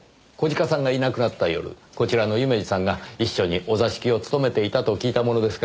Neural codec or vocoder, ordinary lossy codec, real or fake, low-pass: none; none; real; none